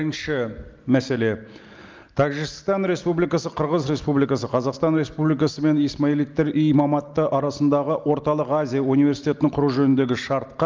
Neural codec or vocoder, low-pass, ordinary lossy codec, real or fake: none; 7.2 kHz; Opus, 32 kbps; real